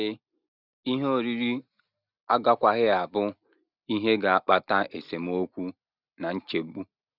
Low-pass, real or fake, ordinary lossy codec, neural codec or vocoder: 5.4 kHz; real; none; none